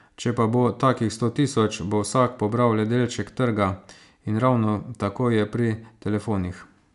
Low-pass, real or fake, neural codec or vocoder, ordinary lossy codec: 10.8 kHz; real; none; none